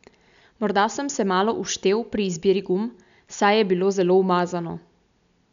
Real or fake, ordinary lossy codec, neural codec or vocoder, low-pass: real; none; none; 7.2 kHz